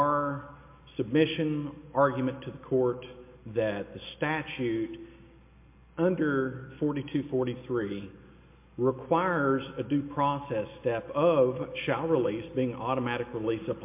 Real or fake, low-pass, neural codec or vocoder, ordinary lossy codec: real; 3.6 kHz; none; MP3, 24 kbps